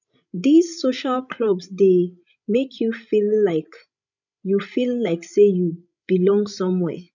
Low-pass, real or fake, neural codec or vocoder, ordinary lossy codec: 7.2 kHz; fake; codec, 16 kHz, 16 kbps, FreqCodec, larger model; none